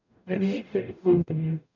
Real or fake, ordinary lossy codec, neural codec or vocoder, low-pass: fake; none; codec, 44.1 kHz, 0.9 kbps, DAC; 7.2 kHz